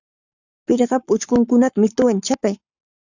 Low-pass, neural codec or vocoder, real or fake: 7.2 kHz; codec, 44.1 kHz, 7.8 kbps, Pupu-Codec; fake